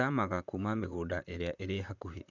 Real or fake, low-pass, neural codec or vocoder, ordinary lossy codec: fake; 7.2 kHz; vocoder, 44.1 kHz, 128 mel bands, Pupu-Vocoder; none